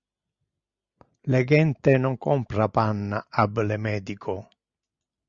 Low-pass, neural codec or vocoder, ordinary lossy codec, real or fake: 7.2 kHz; none; Opus, 64 kbps; real